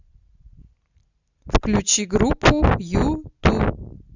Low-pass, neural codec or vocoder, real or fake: 7.2 kHz; none; real